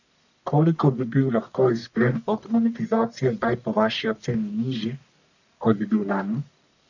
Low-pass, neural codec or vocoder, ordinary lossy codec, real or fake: 7.2 kHz; codec, 44.1 kHz, 1.7 kbps, Pupu-Codec; none; fake